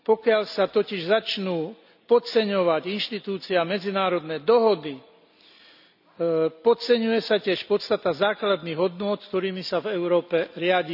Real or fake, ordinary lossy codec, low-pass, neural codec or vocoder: real; none; 5.4 kHz; none